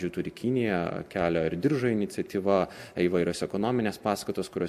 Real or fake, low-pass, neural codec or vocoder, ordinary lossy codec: real; 14.4 kHz; none; MP3, 64 kbps